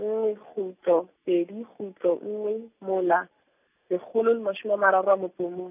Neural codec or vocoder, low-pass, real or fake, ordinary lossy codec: none; 3.6 kHz; real; none